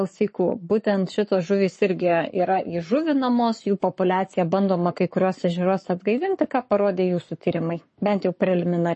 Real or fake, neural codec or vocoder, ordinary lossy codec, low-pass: fake; codec, 44.1 kHz, 7.8 kbps, Pupu-Codec; MP3, 32 kbps; 10.8 kHz